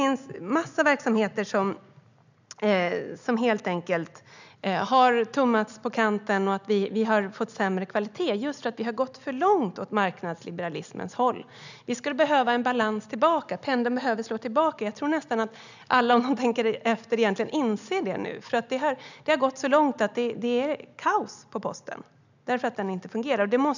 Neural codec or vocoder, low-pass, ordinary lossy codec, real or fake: none; 7.2 kHz; none; real